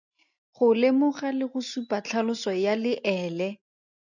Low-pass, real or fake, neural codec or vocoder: 7.2 kHz; real; none